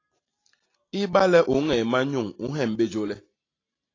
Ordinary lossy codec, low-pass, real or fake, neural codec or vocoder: AAC, 32 kbps; 7.2 kHz; real; none